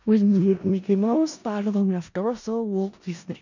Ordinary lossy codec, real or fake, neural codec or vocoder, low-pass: none; fake; codec, 16 kHz in and 24 kHz out, 0.4 kbps, LongCat-Audio-Codec, four codebook decoder; 7.2 kHz